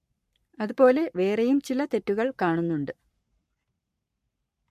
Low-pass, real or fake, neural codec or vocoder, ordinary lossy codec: 14.4 kHz; fake; codec, 44.1 kHz, 7.8 kbps, Pupu-Codec; MP3, 64 kbps